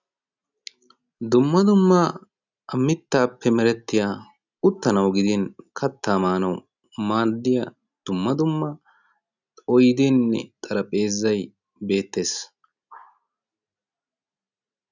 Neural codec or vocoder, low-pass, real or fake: none; 7.2 kHz; real